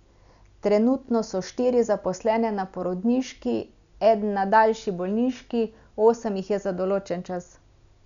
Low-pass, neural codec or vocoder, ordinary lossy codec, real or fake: 7.2 kHz; none; none; real